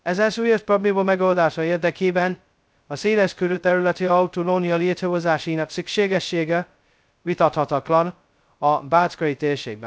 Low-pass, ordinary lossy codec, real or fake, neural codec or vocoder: none; none; fake; codec, 16 kHz, 0.2 kbps, FocalCodec